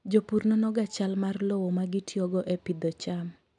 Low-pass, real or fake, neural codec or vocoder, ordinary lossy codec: 10.8 kHz; real; none; none